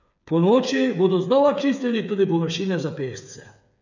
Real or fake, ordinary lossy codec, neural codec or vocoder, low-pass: fake; none; codec, 16 kHz, 8 kbps, FreqCodec, smaller model; 7.2 kHz